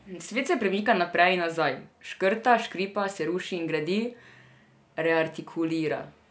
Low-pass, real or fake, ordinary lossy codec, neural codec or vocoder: none; real; none; none